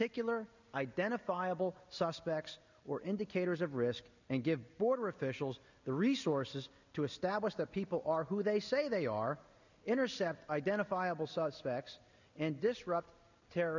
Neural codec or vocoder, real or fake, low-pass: none; real; 7.2 kHz